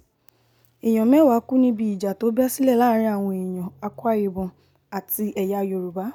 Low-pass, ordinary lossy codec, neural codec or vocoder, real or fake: 19.8 kHz; none; none; real